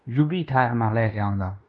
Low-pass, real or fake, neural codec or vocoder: 10.8 kHz; fake; codec, 16 kHz in and 24 kHz out, 0.9 kbps, LongCat-Audio-Codec, fine tuned four codebook decoder